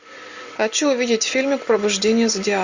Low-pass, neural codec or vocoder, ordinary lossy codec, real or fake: 7.2 kHz; none; Opus, 64 kbps; real